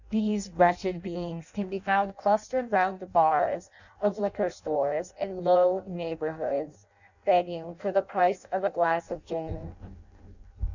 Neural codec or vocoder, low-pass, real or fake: codec, 16 kHz in and 24 kHz out, 0.6 kbps, FireRedTTS-2 codec; 7.2 kHz; fake